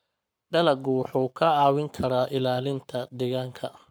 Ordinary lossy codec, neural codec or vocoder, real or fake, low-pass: none; codec, 44.1 kHz, 7.8 kbps, Pupu-Codec; fake; none